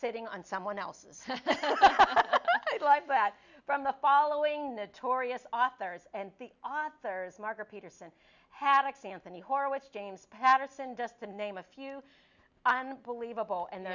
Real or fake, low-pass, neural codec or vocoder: real; 7.2 kHz; none